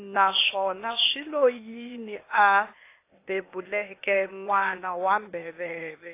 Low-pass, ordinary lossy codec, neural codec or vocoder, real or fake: 3.6 kHz; AAC, 24 kbps; codec, 16 kHz, 0.8 kbps, ZipCodec; fake